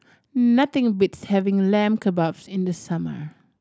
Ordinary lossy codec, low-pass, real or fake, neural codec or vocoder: none; none; real; none